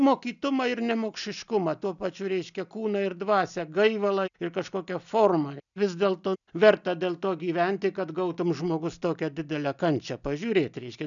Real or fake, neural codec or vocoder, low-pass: real; none; 7.2 kHz